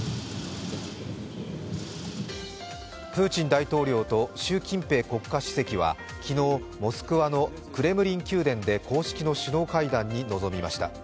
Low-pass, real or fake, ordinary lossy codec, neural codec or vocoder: none; real; none; none